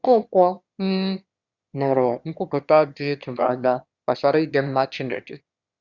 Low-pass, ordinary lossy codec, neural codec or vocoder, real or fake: 7.2 kHz; Opus, 64 kbps; autoencoder, 22.05 kHz, a latent of 192 numbers a frame, VITS, trained on one speaker; fake